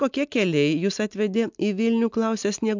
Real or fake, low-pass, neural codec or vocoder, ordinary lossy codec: real; 7.2 kHz; none; MP3, 64 kbps